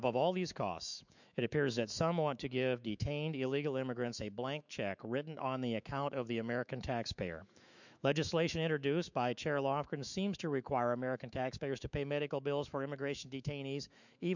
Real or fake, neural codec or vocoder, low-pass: fake; autoencoder, 48 kHz, 128 numbers a frame, DAC-VAE, trained on Japanese speech; 7.2 kHz